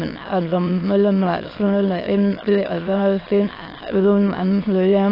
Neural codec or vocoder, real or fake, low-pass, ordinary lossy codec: autoencoder, 22.05 kHz, a latent of 192 numbers a frame, VITS, trained on many speakers; fake; 5.4 kHz; AAC, 24 kbps